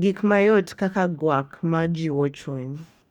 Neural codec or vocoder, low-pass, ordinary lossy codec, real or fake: codec, 44.1 kHz, 2.6 kbps, DAC; 19.8 kHz; none; fake